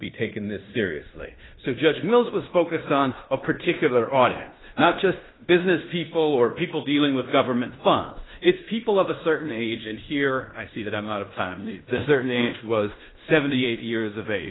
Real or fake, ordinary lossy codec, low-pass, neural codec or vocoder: fake; AAC, 16 kbps; 7.2 kHz; codec, 16 kHz in and 24 kHz out, 0.9 kbps, LongCat-Audio-Codec, fine tuned four codebook decoder